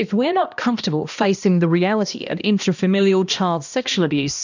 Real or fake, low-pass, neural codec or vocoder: fake; 7.2 kHz; codec, 16 kHz, 1 kbps, X-Codec, HuBERT features, trained on balanced general audio